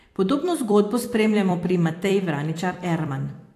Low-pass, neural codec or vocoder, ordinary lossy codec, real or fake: 14.4 kHz; vocoder, 44.1 kHz, 128 mel bands every 256 samples, BigVGAN v2; AAC, 64 kbps; fake